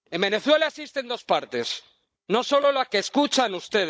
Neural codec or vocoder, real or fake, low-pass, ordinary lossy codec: codec, 16 kHz, 16 kbps, FunCodec, trained on Chinese and English, 50 frames a second; fake; none; none